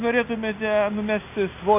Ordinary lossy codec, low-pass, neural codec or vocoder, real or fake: AAC, 32 kbps; 3.6 kHz; none; real